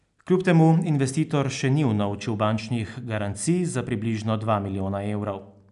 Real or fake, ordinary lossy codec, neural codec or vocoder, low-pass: real; none; none; 10.8 kHz